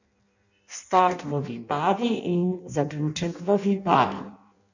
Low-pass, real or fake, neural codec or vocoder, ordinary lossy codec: 7.2 kHz; fake; codec, 16 kHz in and 24 kHz out, 0.6 kbps, FireRedTTS-2 codec; none